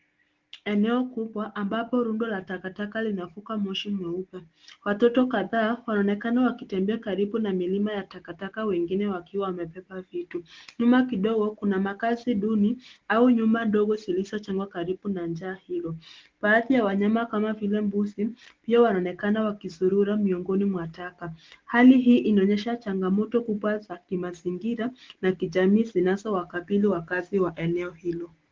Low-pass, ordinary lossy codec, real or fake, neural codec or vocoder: 7.2 kHz; Opus, 16 kbps; real; none